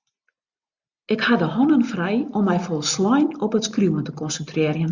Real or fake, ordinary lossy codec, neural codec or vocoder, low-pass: real; Opus, 64 kbps; none; 7.2 kHz